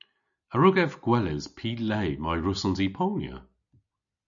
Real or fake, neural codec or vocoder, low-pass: real; none; 7.2 kHz